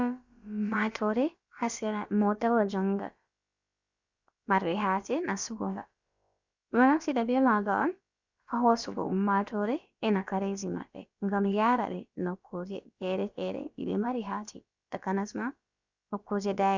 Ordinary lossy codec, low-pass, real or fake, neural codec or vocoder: Opus, 64 kbps; 7.2 kHz; fake; codec, 16 kHz, about 1 kbps, DyCAST, with the encoder's durations